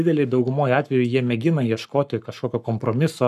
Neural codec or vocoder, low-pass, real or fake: codec, 44.1 kHz, 7.8 kbps, Pupu-Codec; 14.4 kHz; fake